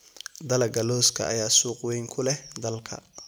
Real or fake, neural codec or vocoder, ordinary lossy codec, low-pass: real; none; none; none